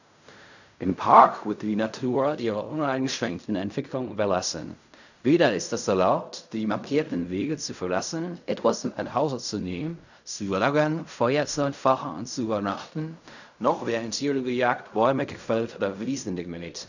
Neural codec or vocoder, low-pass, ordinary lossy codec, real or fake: codec, 16 kHz in and 24 kHz out, 0.4 kbps, LongCat-Audio-Codec, fine tuned four codebook decoder; 7.2 kHz; none; fake